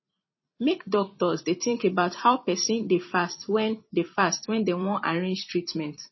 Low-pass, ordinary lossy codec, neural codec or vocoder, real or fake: 7.2 kHz; MP3, 24 kbps; vocoder, 44.1 kHz, 128 mel bands every 256 samples, BigVGAN v2; fake